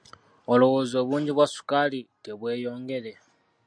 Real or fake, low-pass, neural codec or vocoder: real; 9.9 kHz; none